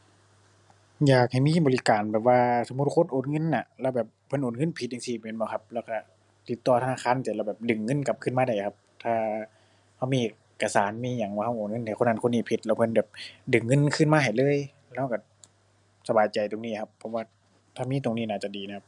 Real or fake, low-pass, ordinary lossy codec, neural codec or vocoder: real; 10.8 kHz; none; none